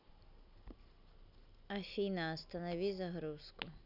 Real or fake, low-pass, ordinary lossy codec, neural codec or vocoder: real; 5.4 kHz; none; none